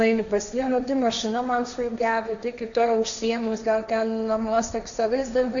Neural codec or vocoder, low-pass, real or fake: codec, 16 kHz, 1.1 kbps, Voila-Tokenizer; 7.2 kHz; fake